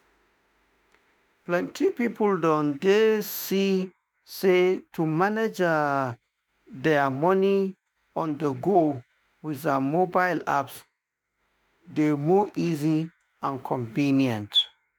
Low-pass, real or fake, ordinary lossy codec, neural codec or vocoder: none; fake; none; autoencoder, 48 kHz, 32 numbers a frame, DAC-VAE, trained on Japanese speech